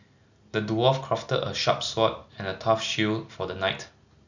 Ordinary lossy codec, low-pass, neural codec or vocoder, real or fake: none; 7.2 kHz; none; real